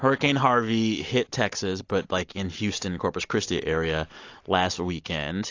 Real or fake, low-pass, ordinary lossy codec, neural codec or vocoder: real; 7.2 kHz; AAC, 48 kbps; none